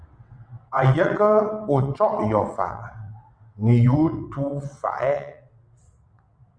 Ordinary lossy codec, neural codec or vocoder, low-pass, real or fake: Opus, 64 kbps; vocoder, 22.05 kHz, 80 mel bands, WaveNeXt; 9.9 kHz; fake